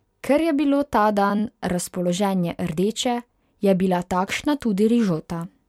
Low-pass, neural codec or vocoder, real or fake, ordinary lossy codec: 19.8 kHz; vocoder, 44.1 kHz, 128 mel bands every 256 samples, BigVGAN v2; fake; MP3, 96 kbps